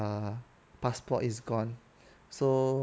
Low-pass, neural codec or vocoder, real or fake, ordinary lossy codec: none; none; real; none